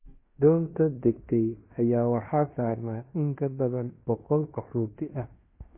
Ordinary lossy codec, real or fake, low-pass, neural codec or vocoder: MP3, 24 kbps; fake; 3.6 kHz; codec, 16 kHz in and 24 kHz out, 0.9 kbps, LongCat-Audio-Codec, fine tuned four codebook decoder